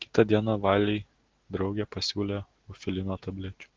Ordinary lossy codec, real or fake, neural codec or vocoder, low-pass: Opus, 16 kbps; real; none; 7.2 kHz